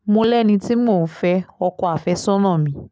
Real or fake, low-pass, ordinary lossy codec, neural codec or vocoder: real; none; none; none